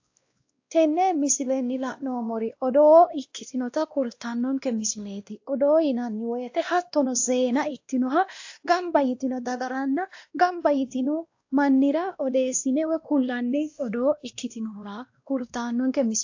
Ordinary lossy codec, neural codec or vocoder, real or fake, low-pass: AAC, 48 kbps; codec, 16 kHz, 1 kbps, X-Codec, WavLM features, trained on Multilingual LibriSpeech; fake; 7.2 kHz